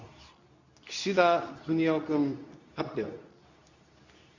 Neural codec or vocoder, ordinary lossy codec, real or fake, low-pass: codec, 24 kHz, 0.9 kbps, WavTokenizer, medium speech release version 2; none; fake; 7.2 kHz